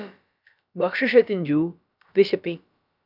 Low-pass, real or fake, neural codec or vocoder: 5.4 kHz; fake; codec, 16 kHz, about 1 kbps, DyCAST, with the encoder's durations